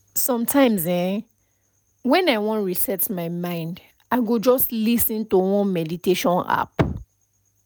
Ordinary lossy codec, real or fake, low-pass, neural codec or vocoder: none; real; none; none